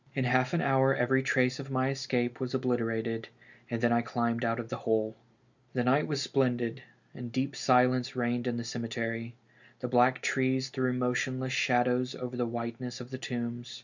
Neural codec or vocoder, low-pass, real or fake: none; 7.2 kHz; real